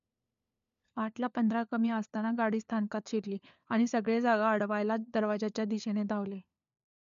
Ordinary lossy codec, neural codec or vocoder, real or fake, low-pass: none; codec, 16 kHz, 4 kbps, FunCodec, trained on LibriTTS, 50 frames a second; fake; 7.2 kHz